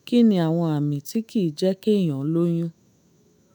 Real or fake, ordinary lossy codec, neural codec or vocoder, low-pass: fake; none; autoencoder, 48 kHz, 128 numbers a frame, DAC-VAE, trained on Japanese speech; none